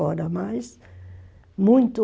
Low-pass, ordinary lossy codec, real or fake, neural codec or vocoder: none; none; real; none